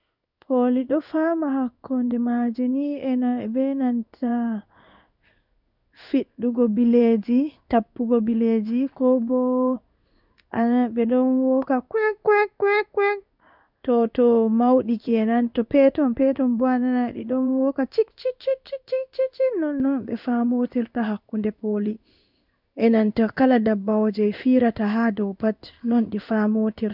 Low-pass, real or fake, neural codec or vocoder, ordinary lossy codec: 5.4 kHz; fake; codec, 16 kHz in and 24 kHz out, 1 kbps, XY-Tokenizer; none